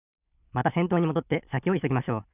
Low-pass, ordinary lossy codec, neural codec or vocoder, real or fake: 3.6 kHz; none; none; real